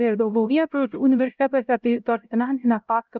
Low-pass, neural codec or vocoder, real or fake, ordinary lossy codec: 7.2 kHz; codec, 16 kHz, 0.5 kbps, X-Codec, HuBERT features, trained on LibriSpeech; fake; Opus, 32 kbps